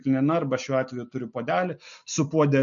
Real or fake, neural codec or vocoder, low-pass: real; none; 7.2 kHz